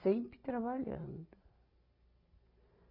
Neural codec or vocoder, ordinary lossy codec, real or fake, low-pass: none; none; real; 5.4 kHz